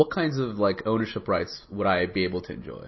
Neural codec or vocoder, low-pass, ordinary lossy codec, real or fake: none; 7.2 kHz; MP3, 24 kbps; real